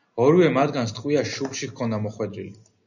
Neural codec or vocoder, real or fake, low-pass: none; real; 7.2 kHz